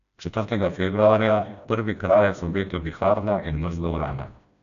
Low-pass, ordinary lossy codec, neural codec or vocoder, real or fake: 7.2 kHz; none; codec, 16 kHz, 1 kbps, FreqCodec, smaller model; fake